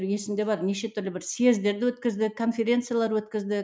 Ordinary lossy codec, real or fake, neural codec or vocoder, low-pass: none; real; none; none